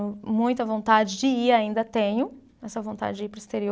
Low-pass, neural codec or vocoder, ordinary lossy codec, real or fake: none; none; none; real